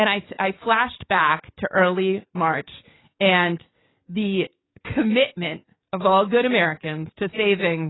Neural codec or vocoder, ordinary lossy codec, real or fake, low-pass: codec, 44.1 kHz, 7.8 kbps, DAC; AAC, 16 kbps; fake; 7.2 kHz